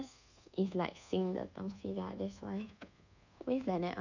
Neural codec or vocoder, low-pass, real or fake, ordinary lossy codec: codec, 24 kHz, 1.2 kbps, DualCodec; 7.2 kHz; fake; none